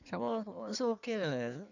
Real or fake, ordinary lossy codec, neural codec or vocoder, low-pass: fake; none; codec, 16 kHz in and 24 kHz out, 1.1 kbps, FireRedTTS-2 codec; 7.2 kHz